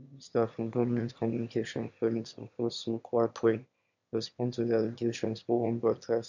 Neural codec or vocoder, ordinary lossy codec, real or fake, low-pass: autoencoder, 22.05 kHz, a latent of 192 numbers a frame, VITS, trained on one speaker; none; fake; 7.2 kHz